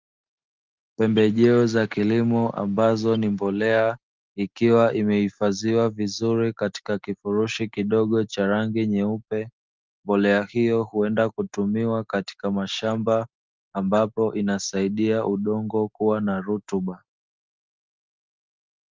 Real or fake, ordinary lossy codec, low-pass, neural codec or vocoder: real; Opus, 32 kbps; 7.2 kHz; none